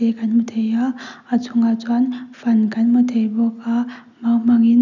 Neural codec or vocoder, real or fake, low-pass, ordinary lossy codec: none; real; 7.2 kHz; none